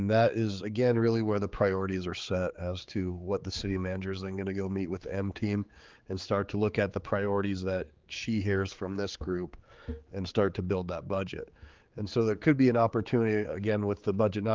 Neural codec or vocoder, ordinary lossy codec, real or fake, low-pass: codec, 16 kHz, 4 kbps, X-Codec, HuBERT features, trained on general audio; Opus, 32 kbps; fake; 7.2 kHz